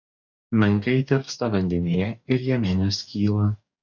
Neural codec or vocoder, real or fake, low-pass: codec, 44.1 kHz, 2.6 kbps, DAC; fake; 7.2 kHz